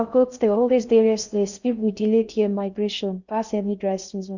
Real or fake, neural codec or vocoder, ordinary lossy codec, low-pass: fake; codec, 16 kHz in and 24 kHz out, 0.6 kbps, FocalCodec, streaming, 4096 codes; none; 7.2 kHz